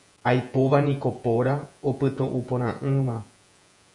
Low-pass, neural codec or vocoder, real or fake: 10.8 kHz; vocoder, 48 kHz, 128 mel bands, Vocos; fake